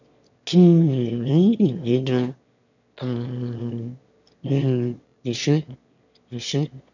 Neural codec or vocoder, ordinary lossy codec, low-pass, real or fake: autoencoder, 22.05 kHz, a latent of 192 numbers a frame, VITS, trained on one speaker; none; 7.2 kHz; fake